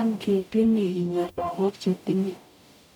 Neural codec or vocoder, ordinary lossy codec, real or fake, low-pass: codec, 44.1 kHz, 0.9 kbps, DAC; none; fake; 19.8 kHz